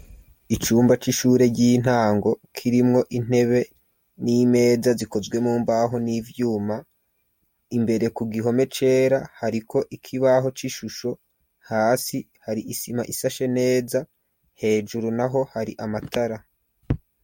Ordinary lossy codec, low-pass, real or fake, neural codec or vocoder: MP3, 64 kbps; 19.8 kHz; real; none